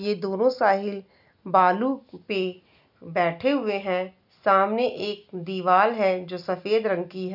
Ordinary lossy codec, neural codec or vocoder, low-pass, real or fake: none; none; 5.4 kHz; real